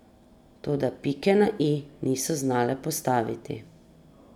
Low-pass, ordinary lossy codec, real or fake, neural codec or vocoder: 19.8 kHz; none; real; none